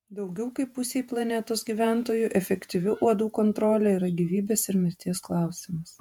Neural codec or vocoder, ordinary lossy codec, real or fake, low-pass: vocoder, 48 kHz, 128 mel bands, Vocos; MP3, 96 kbps; fake; 19.8 kHz